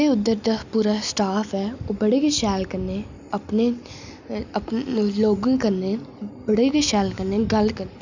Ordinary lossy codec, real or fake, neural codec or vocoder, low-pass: none; real; none; 7.2 kHz